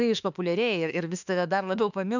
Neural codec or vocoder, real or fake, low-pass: autoencoder, 48 kHz, 32 numbers a frame, DAC-VAE, trained on Japanese speech; fake; 7.2 kHz